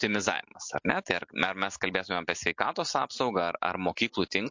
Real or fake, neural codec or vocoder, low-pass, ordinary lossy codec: real; none; 7.2 kHz; MP3, 48 kbps